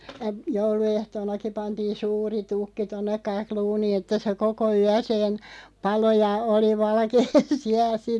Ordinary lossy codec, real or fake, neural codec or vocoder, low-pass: none; real; none; none